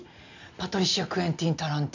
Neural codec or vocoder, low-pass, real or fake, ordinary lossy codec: none; 7.2 kHz; real; none